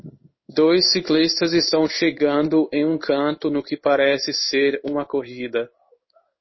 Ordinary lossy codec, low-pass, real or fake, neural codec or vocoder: MP3, 24 kbps; 7.2 kHz; fake; codec, 16 kHz in and 24 kHz out, 1 kbps, XY-Tokenizer